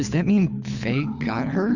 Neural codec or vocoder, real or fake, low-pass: codec, 24 kHz, 6 kbps, HILCodec; fake; 7.2 kHz